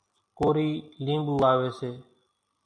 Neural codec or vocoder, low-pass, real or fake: none; 9.9 kHz; real